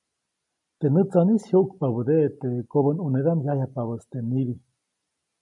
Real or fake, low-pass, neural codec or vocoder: real; 10.8 kHz; none